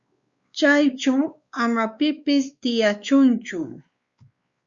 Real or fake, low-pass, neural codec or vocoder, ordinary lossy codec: fake; 7.2 kHz; codec, 16 kHz, 2 kbps, X-Codec, WavLM features, trained on Multilingual LibriSpeech; Opus, 64 kbps